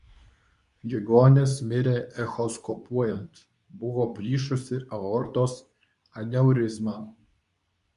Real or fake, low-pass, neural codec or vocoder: fake; 10.8 kHz; codec, 24 kHz, 0.9 kbps, WavTokenizer, medium speech release version 2